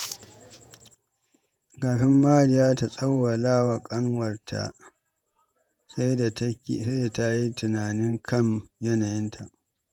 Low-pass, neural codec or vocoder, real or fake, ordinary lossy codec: 19.8 kHz; vocoder, 48 kHz, 128 mel bands, Vocos; fake; none